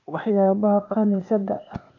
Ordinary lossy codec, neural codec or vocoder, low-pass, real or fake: none; codec, 16 kHz, 0.8 kbps, ZipCodec; 7.2 kHz; fake